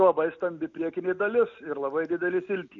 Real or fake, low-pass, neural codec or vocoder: real; 7.2 kHz; none